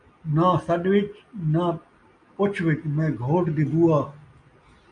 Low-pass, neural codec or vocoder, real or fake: 9.9 kHz; none; real